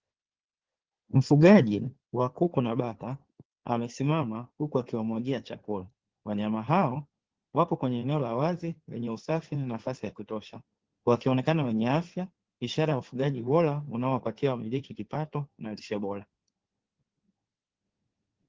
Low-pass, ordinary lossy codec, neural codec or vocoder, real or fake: 7.2 kHz; Opus, 16 kbps; codec, 16 kHz in and 24 kHz out, 2.2 kbps, FireRedTTS-2 codec; fake